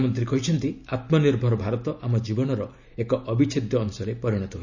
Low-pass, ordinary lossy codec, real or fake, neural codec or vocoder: 7.2 kHz; none; real; none